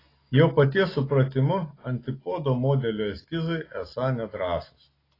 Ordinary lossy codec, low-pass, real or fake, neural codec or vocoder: AAC, 24 kbps; 5.4 kHz; real; none